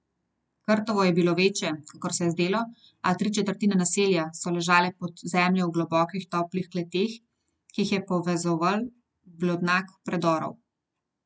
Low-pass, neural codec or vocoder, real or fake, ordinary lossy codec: none; none; real; none